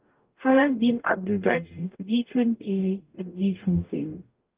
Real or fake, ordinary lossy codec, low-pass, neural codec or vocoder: fake; Opus, 32 kbps; 3.6 kHz; codec, 44.1 kHz, 0.9 kbps, DAC